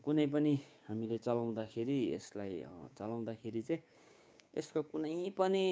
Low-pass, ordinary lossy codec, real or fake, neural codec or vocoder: none; none; fake; codec, 16 kHz, 6 kbps, DAC